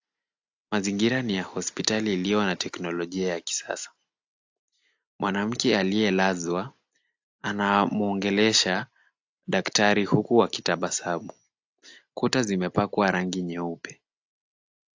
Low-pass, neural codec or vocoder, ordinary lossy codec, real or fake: 7.2 kHz; none; AAC, 48 kbps; real